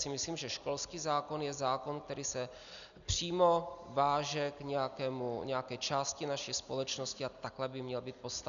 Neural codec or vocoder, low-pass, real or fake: none; 7.2 kHz; real